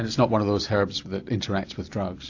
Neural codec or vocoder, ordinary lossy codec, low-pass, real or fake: none; AAC, 48 kbps; 7.2 kHz; real